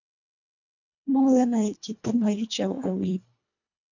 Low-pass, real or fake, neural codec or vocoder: 7.2 kHz; fake; codec, 24 kHz, 1.5 kbps, HILCodec